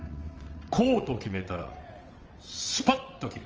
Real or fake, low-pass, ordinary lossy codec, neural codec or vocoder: fake; 7.2 kHz; Opus, 24 kbps; vocoder, 22.05 kHz, 80 mel bands, WaveNeXt